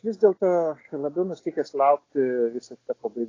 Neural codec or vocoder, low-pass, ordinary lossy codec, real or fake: none; 7.2 kHz; AAC, 32 kbps; real